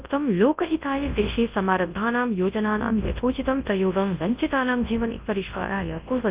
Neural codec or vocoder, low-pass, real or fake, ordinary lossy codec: codec, 24 kHz, 0.9 kbps, WavTokenizer, large speech release; 3.6 kHz; fake; Opus, 24 kbps